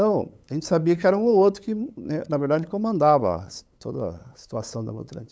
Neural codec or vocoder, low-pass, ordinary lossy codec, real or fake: codec, 16 kHz, 8 kbps, FunCodec, trained on LibriTTS, 25 frames a second; none; none; fake